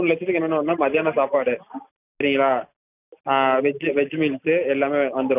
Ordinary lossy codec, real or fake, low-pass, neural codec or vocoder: none; real; 3.6 kHz; none